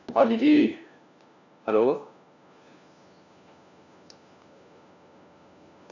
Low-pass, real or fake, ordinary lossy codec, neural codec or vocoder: 7.2 kHz; fake; none; codec, 16 kHz, 1 kbps, FunCodec, trained on LibriTTS, 50 frames a second